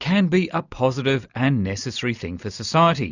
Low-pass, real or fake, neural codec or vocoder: 7.2 kHz; real; none